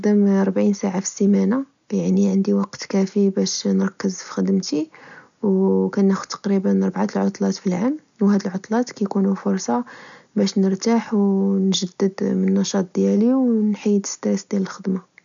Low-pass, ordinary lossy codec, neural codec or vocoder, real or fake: 7.2 kHz; none; none; real